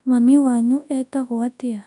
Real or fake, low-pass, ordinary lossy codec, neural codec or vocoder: fake; 10.8 kHz; none; codec, 24 kHz, 0.9 kbps, WavTokenizer, large speech release